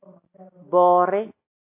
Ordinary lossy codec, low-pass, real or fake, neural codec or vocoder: AAC, 24 kbps; 3.6 kHz; real; none